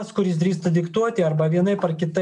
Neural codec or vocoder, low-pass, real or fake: none; 10.8 kHz; real